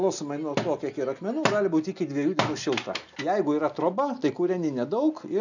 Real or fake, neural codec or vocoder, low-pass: real; none; 7.2 kHz